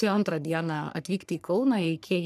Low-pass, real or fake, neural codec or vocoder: 14.4 kHz; fake; codec, 44.1 kHz, 3.4 kbps, Pupu-Codec